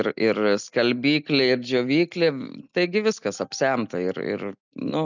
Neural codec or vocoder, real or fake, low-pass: none; real; 7.2 kHz